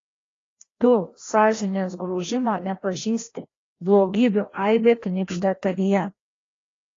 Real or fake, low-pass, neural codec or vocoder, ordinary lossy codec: fake; 7.2 kHz; codec, 16 kHz, 1 kbps, FreqCodec, larger model; AAC, 32 kbps